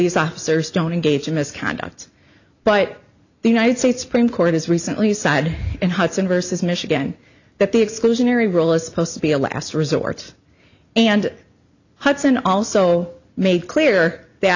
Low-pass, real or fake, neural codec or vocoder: 7.2 kHz; real; none